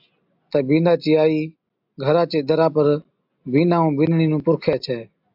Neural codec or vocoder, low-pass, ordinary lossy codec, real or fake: none; 5.4 kHz; AAC, 48 kbps; real